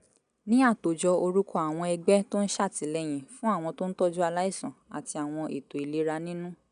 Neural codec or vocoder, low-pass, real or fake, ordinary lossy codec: none; 9.9 kHz; real; none